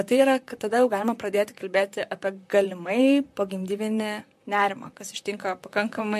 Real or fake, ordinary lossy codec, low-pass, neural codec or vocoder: fake; MP3, 64 kbps; 14.4 kHz; vocoder, 44.1 kHz, 128 mel bands, Pupu-Vocoder